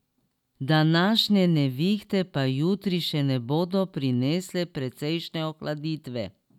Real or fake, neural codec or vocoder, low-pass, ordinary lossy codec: real; none; 19.8 kHz; none